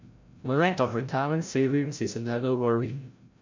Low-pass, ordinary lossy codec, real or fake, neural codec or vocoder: 7.2 kHz; MP3, 64 kbps; fake; codec, 16 kHz, 0.5 kbps, FreqCodec, larger model